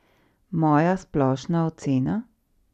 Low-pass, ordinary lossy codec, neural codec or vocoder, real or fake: 14.4 kHz; none; none; real